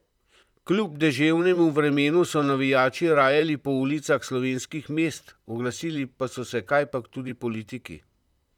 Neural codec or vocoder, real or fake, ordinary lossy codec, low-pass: vocoder, 44.1 kHz, 128 mel bands, Pupu-Vocoder; fake; none; 19.8 kHz